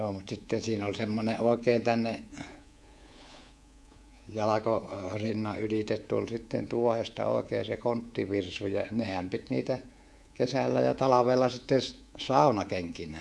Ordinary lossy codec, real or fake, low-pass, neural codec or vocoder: none; fake; none; codec, 24 kHz, 3.1 kbps, DualCodec